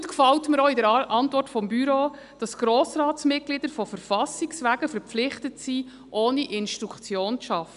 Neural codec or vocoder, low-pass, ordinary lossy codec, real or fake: none; 10.8 kHz; none; real